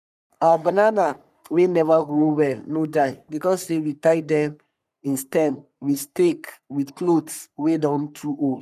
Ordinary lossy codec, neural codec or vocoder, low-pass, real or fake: none; codec, 44.1 kHz, 3.4 kbps, Pupu-Codec; 14.4 kHz; fake